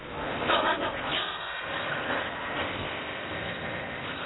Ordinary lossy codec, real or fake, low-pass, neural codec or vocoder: AAC, 16 kbps; fake; 7.2 kHz; codec, 16 kHz in and 24 kHz out, 0.6 kbps, FocalCodec, streaming, 4096 codes